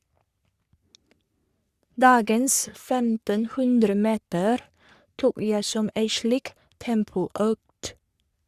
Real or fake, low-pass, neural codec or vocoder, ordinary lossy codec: fake; 14.4 kHz; codec, 44.1 kHz, 3.4 kbps, Pupu-Codec; Opus, 64 kbps